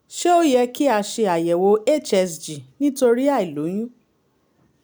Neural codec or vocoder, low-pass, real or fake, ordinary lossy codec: none; none; real; none